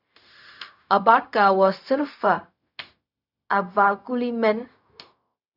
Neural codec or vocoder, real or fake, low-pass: codec, 16 kHz, 0.4 kbps, LongCat-Audio-Codec; fake; 5.4 kHz